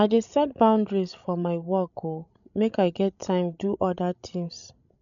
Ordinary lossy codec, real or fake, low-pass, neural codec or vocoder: none; fake; 7.2 kHz; codec, 16 kHz, 16 kbps, FreqCodec, larger model